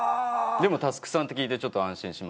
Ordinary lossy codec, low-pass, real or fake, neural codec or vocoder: none; none; real; none